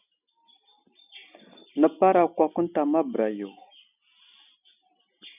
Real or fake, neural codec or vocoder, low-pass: real; none; 3.6 kHz